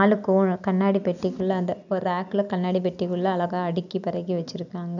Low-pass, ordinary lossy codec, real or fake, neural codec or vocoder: 7.2 kHz; none; real; none